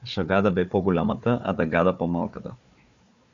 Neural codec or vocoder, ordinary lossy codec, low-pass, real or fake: codec, 16 kHz, 4 kbps, FunCodec, trained on Chinese and English, 50 frames a second; MP3, 96 kbps; 7.2 kHz; fake